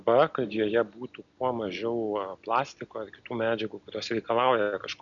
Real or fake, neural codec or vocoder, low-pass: real; none; 7.2 kHz